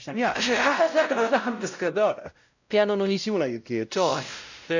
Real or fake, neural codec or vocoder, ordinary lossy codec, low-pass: fake; codec, 16 kHz, 0.5 kbps, X-Codec, WavLM features, trained on Multilingual LibriSpeech; none; 7.2 kHz